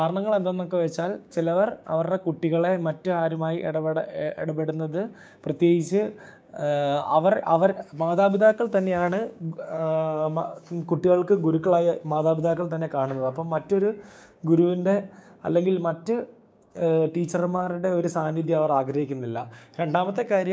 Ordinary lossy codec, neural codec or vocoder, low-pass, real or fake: none; codec, 16 kHz, 6 kbps, DAC; none; fake